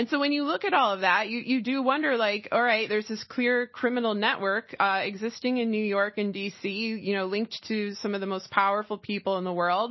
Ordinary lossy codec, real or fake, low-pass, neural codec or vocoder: MP3, 24 kbps; real; 7.2 kHz; none